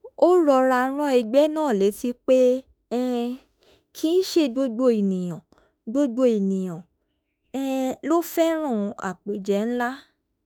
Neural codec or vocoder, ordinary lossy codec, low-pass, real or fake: autoencoder, 48 kHz, 32 numbers a frame, DAC-VAE, trained on Japanese speech; none; none; fake